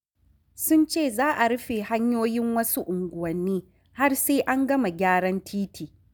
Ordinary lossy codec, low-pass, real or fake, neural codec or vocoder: none; none; real; none